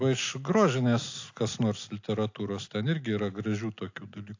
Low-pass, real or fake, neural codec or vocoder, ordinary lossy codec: 7.2 kHz; real; none; AAC, 48 kbps